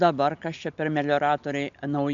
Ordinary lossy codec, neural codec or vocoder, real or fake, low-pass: AAC, 64 kbps; none; real; 7.2 kHz